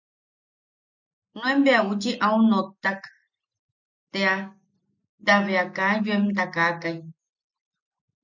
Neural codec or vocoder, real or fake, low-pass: none; real; 7.2 kHz